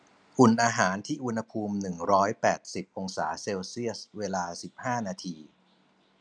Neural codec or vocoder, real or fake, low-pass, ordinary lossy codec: none; real; 9.9 kHz; none